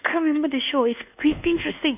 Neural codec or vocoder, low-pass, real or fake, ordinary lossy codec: codec, 24 kHz, 1.2 kbps, DualCodec; 3.6 kHz; fake; none